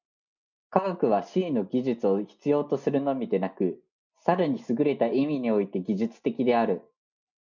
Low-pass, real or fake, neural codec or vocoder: 7.2 kHz; real; none